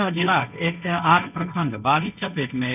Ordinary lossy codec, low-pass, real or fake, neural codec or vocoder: none; 3.6 kHz; fake; codec, 16 kHz, 1.1 kbps, Voila-Tokenizer